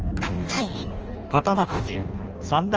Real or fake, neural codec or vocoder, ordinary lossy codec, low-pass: fake; codec, 16 kHz in and 24 kHz out, 0.6 kbps, FireRedTTS-2 codec; Opus, 24 kbps; 7.2 kHz